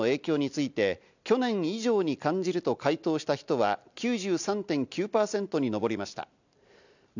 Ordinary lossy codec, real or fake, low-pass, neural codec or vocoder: none; real; 7.2 kHz; none